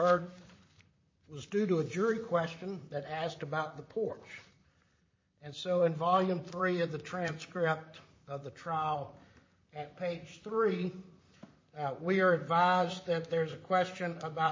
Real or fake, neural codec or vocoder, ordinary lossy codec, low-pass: fake; vocoder, 44.1 kHz, 128 mel bands, Pupu-Vocoder; MP3, 32 kbps; 7.2 kHz